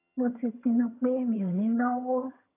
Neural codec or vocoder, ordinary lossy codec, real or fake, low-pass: vocoder, 22.05 kHz, 80 mel bands, HiFi-GAN; none; fake; 3.6 kHz